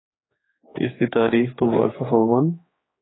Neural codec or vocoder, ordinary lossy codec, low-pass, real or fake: codec, 16 kHz, 4 kbps, X-Codec, HuBERT features, trained on general audio; AAC, 16 kbps; 7.2 kHz; fake